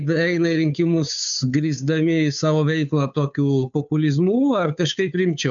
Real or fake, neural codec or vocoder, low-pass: fake; codec, 16 kHz, 2 kbps, FunCodec, trained on Chinese and English, 25 frames a second; 7.2 kHz